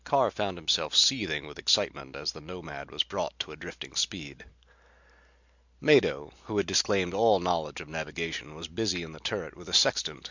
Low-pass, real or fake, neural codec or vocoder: 7.2 kHz; real; none